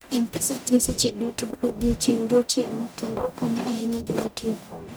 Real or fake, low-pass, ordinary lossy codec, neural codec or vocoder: fake; none; none; codec, 44.1 kHz, 0.9 kbps, DAC